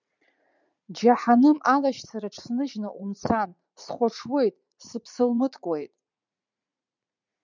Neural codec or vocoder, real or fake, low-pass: vocoder, 44.1 kHz, 80 mel bands, Vocos; fake; 7.2 kHz